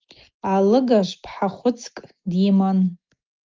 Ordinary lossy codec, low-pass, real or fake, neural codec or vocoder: Opus, 24 kbps; 7.2 kHz; real; none